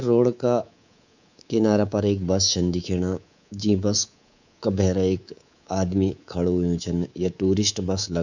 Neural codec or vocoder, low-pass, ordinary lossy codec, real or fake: codec, 24 kHz, 3.1 kbps, DualCodec; 7.2 kHz; none; fake